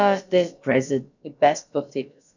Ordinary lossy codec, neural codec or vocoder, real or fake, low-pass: none; codec, 16 kHz, about 1 kbps, DyCAST, with the encoder's durations; fake; 7.2 kHz